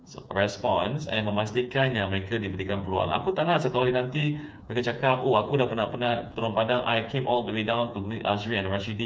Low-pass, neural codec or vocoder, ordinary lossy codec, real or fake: none; codec, 16 kHz, 4 kbps, FreqCodec, smaller model; none; fake